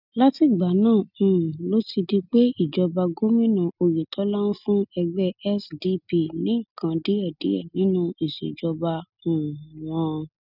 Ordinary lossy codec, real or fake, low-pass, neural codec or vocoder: none; real; 5.4 kHz; none